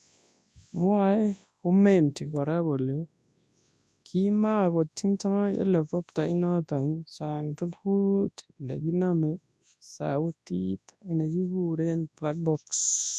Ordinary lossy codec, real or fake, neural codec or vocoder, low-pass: none; fake; codec, 24 kHz, 0.9 kbps, WavTokenizer, large speech release; none